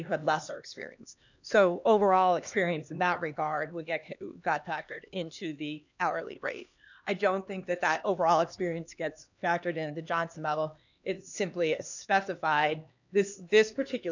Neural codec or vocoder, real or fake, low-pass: codec, 16 kHz, 2 kbps, X-Codec, HuBERT features, trained on LibriSpeech; fake; 7.2 kHz